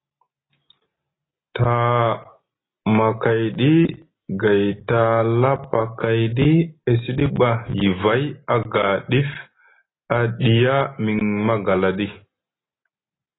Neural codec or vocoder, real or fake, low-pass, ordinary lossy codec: none; real; 7.2 kHz; AAC, 16 kbps